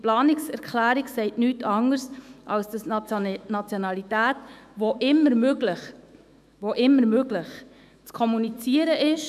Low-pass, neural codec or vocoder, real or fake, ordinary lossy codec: 14.4 kHz; autoencoder, 48 kHz, 128 numbers a frame, DAC-VAE, trained on Japanese speech; fake; none